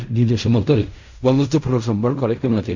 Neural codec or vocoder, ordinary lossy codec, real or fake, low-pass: codec, 16 kHz in and 24 kHz out, 0.4 kbps, LongCat-Audio-Codec, fine tuned four codebook decoder; none; fake; 7.2 kHz